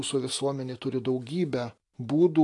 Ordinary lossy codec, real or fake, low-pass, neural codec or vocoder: AAC, 48 kbps; real; 10.8 kHz; none